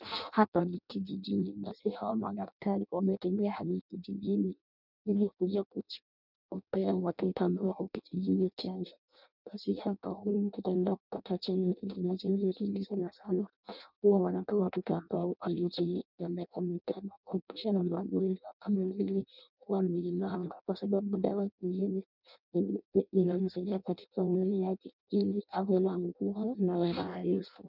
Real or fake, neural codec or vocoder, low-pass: fake; codec, 16 kHz in and 24 kHz out, 0.6 kbps, FireRedTTS-2 codec; 5.4 kHz